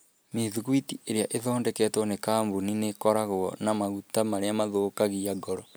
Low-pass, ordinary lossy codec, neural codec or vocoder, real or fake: none; none; none; real